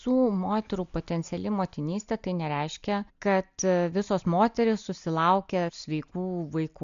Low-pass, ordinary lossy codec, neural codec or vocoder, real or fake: 7.2 kHz; MP3, 64 kbps; none; real